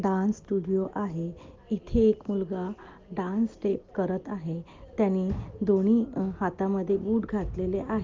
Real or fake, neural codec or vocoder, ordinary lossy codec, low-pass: real; none; Opus, 32 kbps; 7.2 kHz